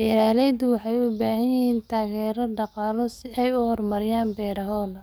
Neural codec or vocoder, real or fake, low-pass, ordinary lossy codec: codec, 44.1 kHz, 7.8 kbps, DAC; fake; none; none